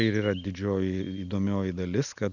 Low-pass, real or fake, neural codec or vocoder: 7.2 kHz; real; none